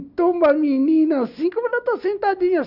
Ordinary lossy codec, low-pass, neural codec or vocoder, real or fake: none; 5.4 kHz; none; real